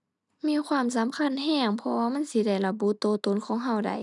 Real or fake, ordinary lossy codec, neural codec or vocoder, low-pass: real; none; none; 10.8 kHz